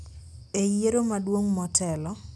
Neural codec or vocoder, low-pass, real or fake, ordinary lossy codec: none; none; real; none